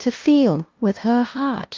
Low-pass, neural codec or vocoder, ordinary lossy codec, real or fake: 7.2 kHz; codec, 16 kHz, 0.5 kbps, FunCodec, trained on LibriTTS, 25 frames a second; Opus, 24 kbps; fake